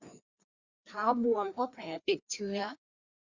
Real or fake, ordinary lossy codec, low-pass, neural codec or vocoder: fake; Opus, 64 kbps; 7.2 kHz; codec, 16 kHz, 2 kbps, FreqCodec, larger model